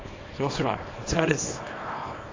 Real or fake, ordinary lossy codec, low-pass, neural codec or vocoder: fake; AAC, 32 kbps; 7.2 kHz; codec, 24 kHz, 0.9 kbps, WavTokenizer, small release